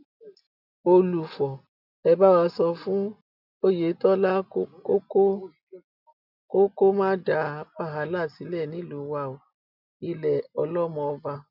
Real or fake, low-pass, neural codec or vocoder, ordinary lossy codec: real; 5.4 kHz; none; none